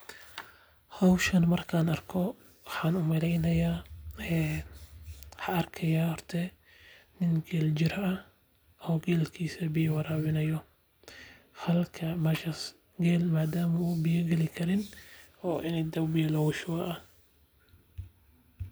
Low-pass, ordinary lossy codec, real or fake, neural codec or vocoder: none; none; real; none